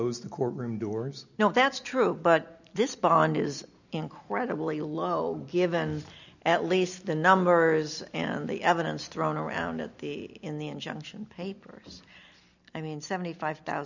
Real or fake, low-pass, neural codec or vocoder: real; 7.2 kHz; none